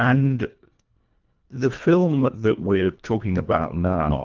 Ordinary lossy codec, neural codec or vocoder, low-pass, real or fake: Opus, 24 kbps; codec, 24 kHz, 1.5 kbps, HILCodec; 7.2 kHz; fake